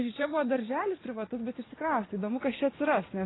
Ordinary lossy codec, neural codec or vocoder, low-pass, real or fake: AAC, 16 kbps; vocoder, 44.1 kHz, 80 mel bands, Vocos; 7.2 kHz; fake